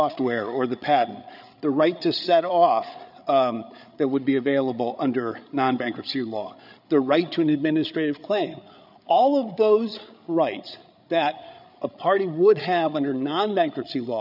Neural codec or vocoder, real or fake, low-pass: codec, 16 kHz, 8 kbps, FreqCodec, larger model; fake; 5.4 kHz